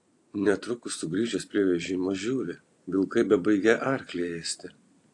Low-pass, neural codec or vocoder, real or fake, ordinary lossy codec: 10.8 kHz; vocoder, 44.1 kHz, 128 mel bands every 256 samples, BigVGAN v2; fake; AAC, 48 kbps